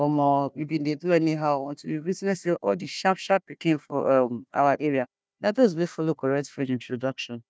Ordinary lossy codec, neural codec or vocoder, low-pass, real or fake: none; codec, 16 kHz, 1 kbps, FunCodec, trained on Chinese and English, 50 frames a second; none; fake